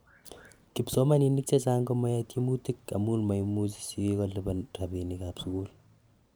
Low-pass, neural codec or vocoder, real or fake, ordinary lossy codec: none; vocoder, 44.1 kHz, 128 mel bands every 512 samples, BigVGAN v2; fake; none